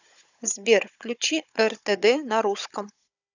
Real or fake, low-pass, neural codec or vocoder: fake; 7.2 kHz; codec, 16 kHz, 16 kbps, FunCodec, trained on Chinese and English, 50 frames a second